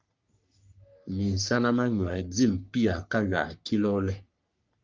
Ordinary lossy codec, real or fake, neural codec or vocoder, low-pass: Opus, 32 kbps; fake; codec, 44.1 kHz, 3.4 kbps, Pupu-Codec; 7.2 kHz